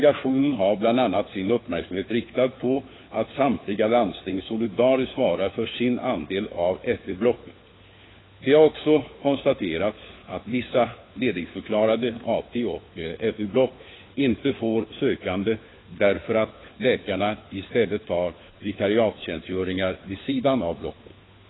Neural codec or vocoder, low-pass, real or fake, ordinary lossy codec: codec, 16 kHz, 4 kbps, FunCodec, trained on LibriTTS, 50 frames a second; 7.2 kHz; fake; AAC, 16 kbps